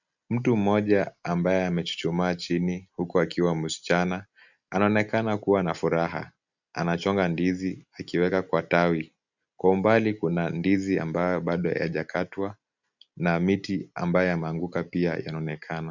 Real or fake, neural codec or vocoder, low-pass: real; none; 7.2 kHz